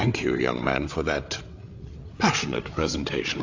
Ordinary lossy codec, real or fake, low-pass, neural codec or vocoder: AAC, 48 kbps; fake; 7.2 kHz; codec, 16 kHz, 8 kbps, FreqCodec, larger model